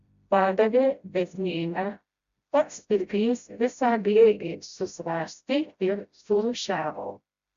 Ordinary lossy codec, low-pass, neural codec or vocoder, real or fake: Opus, 64 kbps; 7.2 kHz; codec, 16 kHz, 0.5 kbps, FreqCodec, smaller model; fake